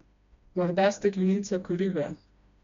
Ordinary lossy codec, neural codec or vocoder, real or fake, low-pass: MP3, 64 kbps; codec, 16 kHz, 1 kbps, FreqCodec, smaller model; fake; 7.2 kHz